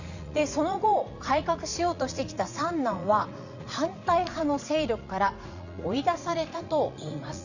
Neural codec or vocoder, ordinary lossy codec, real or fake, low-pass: vocoder, 44.1 kHz, 80 mel bands, Vocos; none; fake; 7.2 kHz